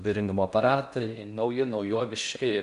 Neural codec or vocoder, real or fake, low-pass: codec, 16 kHz in and 24 kHz out, 0.6 kbps, FocalCodec, streaming, 4096 codes; fake; 10.8 kHz